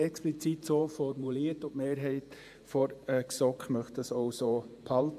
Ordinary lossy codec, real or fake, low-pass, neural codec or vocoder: AAC, 96 kbps; fake; 14.4 kHz; vocoder, 48 kHz, 128 mel bands, Vocos